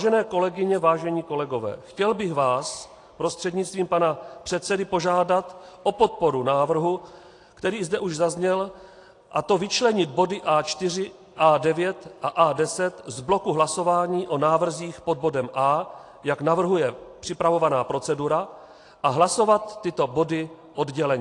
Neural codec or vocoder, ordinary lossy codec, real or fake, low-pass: none; AAC, 48 kbps; real; 10.8 kHz